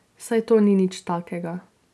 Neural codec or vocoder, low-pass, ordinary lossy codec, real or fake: none; none; none; real